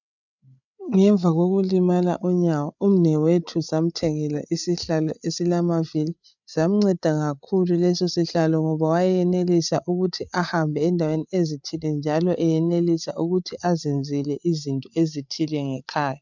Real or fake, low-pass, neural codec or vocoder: fake; 7.2 kHz; codec, 16 kHz, 8 kbps, FreqCodec, larger model